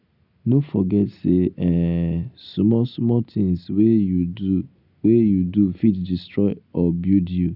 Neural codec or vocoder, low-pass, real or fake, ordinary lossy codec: none; 5.4 kHz; real; none